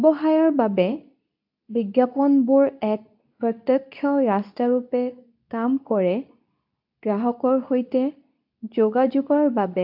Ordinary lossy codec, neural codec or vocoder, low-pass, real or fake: AAC, 48 kbps; codec, 24 kHz, 0.9 kbps, WavTokenizer, medium speech release version 2; 5.4 kHz; fake